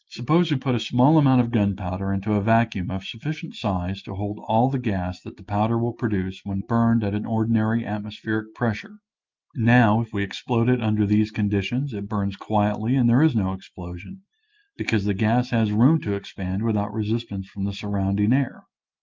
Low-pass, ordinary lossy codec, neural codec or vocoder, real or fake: 7.2 kHz; Opus, 24 kbps; none; real